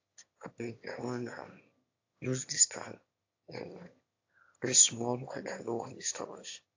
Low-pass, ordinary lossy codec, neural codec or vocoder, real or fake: 7.2 kHz; AAC, 48 kbps; autoencoder, 22.05 kHz, a latent of 192 numbers a frame, VITS, trained on one speaker; fake